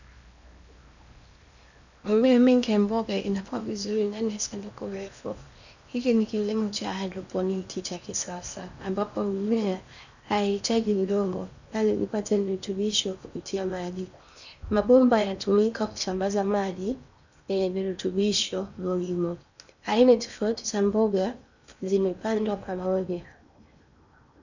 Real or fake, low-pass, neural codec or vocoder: fake; 7.2 kHz; codec, 16 kHz in and 24 kHz out, 0.8 kbps, FocalCodec, streaming, 65536 codes